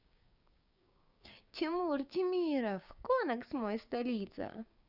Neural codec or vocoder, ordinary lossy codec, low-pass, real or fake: codec, 16 kHz, 6 kbps, DAC; Opus, 64 kbps; 5.4 kHz; fake